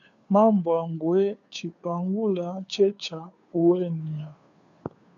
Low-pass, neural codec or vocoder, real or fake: 7.2 kHz; codec, 16 kHz, 2 kbps, FunCodec, trained on Chinese and English, 25 frames a second; fake